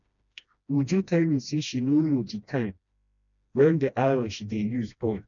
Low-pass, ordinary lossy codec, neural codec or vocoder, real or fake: 7.2 kHz; none; codec, 16 kHz, 1 kbps, FreqCodec, smaller model; fake